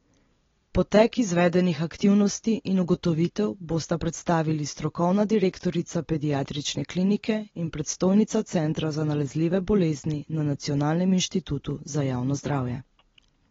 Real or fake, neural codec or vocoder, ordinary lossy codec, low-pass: real; none; AAC, 24 kbps; 7.2 kHz